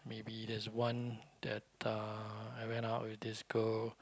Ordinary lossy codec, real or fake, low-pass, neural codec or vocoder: none; real; none; none